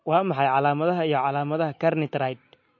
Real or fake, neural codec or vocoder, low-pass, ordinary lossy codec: real; none; 7.2 kHz; MP3, 32 kbps